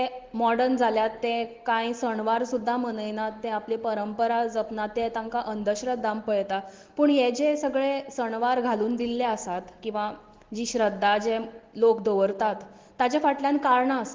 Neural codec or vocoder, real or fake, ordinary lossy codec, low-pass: none; real; Opus, 16 kbps; 7.2 kHz